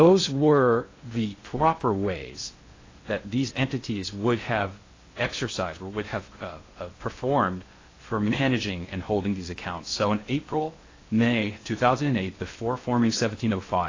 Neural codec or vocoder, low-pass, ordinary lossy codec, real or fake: codec, 16 kHz in and 24 kHz out, 0.6 kbps, FocalCodec, streaming, 2048 codes; 7.2 kHz; AAC, 32 kbps; fake